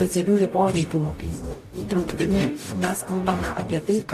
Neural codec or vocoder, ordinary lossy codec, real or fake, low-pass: codec, 44.1 kHz, 0.9 kbps, DAC; AAC, 64 kbps; fake; 14.4 kHz